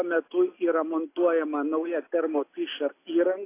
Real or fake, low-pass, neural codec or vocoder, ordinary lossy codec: real; 3.6 kHz; none; AAC, 24 kbps